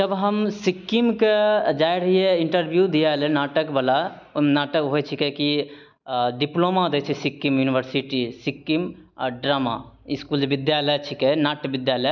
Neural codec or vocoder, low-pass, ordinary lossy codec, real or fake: none; 7.2 kHz; none; real